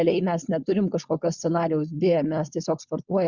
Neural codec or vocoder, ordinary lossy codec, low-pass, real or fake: codec, 16 kHz, 4.8 kbps, FACodec; Opus, 64 kbps; 7.2 kHz; fake